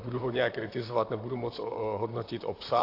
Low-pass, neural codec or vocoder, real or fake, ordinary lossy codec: 5.4 kHz; vocoder, 44.1 kHz, 128 mel bands, Pupu-Vocoder; fake; AAC, 32 kbps